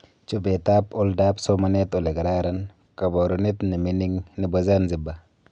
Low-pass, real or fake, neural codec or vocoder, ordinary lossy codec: 9.9 kHz; real; none; none